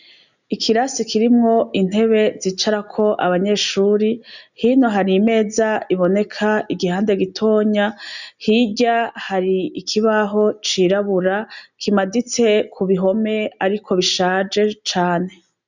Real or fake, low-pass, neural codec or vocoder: real; 7.2 kHz; none